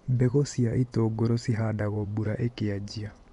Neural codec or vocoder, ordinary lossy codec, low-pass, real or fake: vocoder, 24 kHz, 100 mel bands, Vocos; none; 10.8 kHz; fake